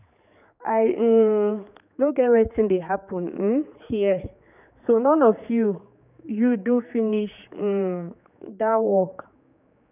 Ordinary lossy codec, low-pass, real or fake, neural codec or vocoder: none; 3.6 kHz; fake; codec, 16 kHz, 4 kbps, X-Codec, HuBERT features, trained on general audio